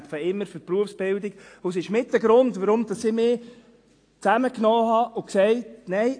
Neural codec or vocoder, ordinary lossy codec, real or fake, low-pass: none; AAC, 48 kbps; real; 9.9 kHz